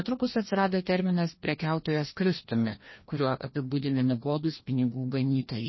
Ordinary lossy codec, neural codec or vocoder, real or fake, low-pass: MP3, 24 kbps; codec, 16 kHz, 1 kbps, FreqCodec, larger model; fake; 7.2 kHz